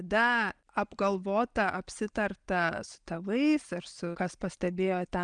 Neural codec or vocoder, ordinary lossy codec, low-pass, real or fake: none; Opus, 32 kbps; 9.9 kHz; real